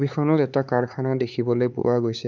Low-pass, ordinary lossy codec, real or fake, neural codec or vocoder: 7.2 kHz; none; fake; codec, 16 kHz, 4 kbps, X-Codec, HuBERT features, trained on LibriSpeech